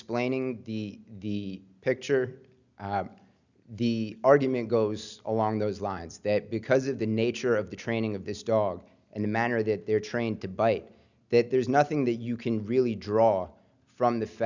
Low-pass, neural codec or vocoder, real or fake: 7.2 kHz; none; real